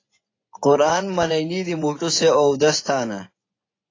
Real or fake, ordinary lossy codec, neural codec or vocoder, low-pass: fake; AAC, 32 kbps; vocoder, 24 kHz, 100 mel bands, Vocos; 7.2 kHz